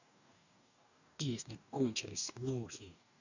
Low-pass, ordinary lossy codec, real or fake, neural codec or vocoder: 7.2 kHz; none; fake; codec, 44.1 kHz, 2.6 kbps, DAC